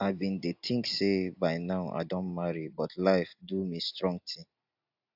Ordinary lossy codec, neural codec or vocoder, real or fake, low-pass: AAC, 48 kbps; none; real; 5.4 kHz